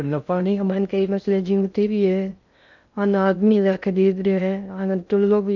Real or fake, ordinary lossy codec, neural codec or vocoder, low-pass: fake; none; codec, 16 kHz in and 24 kHz out, 0.6 kbps, FocalCodec, streaming, 2048 codes; 7.2 kHz